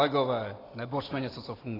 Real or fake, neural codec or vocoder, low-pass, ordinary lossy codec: real; none; 5.4 kHz; AAC, 24 kbps